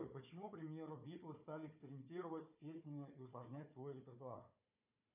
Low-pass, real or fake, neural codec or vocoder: 3.6 kHz; fake; codec, 16 kHz, 4 kbps, FunCodec, trained on Chinese and English, 50 frames a second